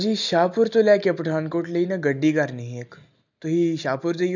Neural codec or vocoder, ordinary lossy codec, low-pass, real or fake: none; none; 7.2 kHz; real